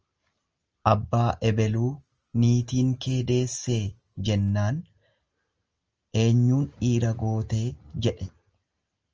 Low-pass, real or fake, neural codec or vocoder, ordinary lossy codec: 7.2 kHz; real; none; Opus, 24 kbps